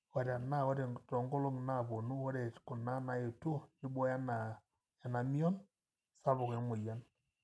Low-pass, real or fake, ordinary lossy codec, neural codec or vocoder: none; real; none; none